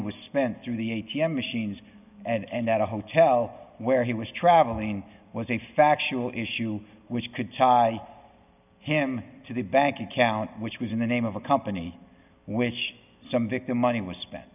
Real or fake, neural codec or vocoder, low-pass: real; none; 3.6 kHz